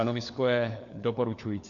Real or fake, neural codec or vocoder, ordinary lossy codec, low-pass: fake; codec, 16 kHz, 4 kbps, FunCodec, trained on LibriTTS, 50 frames a second; AAC, 64 kbps; 7.2 kHz